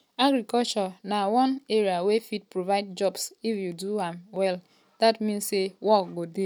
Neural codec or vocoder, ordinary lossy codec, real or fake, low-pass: none; none; real; none